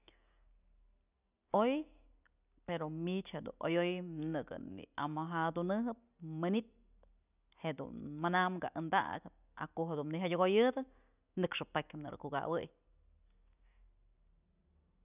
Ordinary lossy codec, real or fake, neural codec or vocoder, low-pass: none; real; none; 3.6 kHz